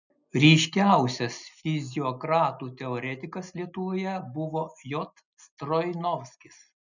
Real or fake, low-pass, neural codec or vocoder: real; 7.2 kHz; none